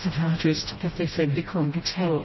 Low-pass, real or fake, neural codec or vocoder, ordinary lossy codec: 7.2 kHz; fake; codec, 16 kHz, 0.5 kbps, FreqCodec, smaller model; MP3, 24 kbps